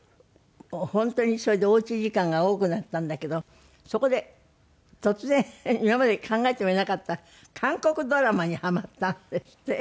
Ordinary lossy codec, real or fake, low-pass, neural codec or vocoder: none; real; none; none